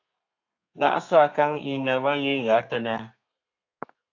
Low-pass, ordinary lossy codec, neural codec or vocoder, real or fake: 7.2 kHz; AAC, 48 kbps; codec, 32 kHz, 1.9 kbps, SNAC; fake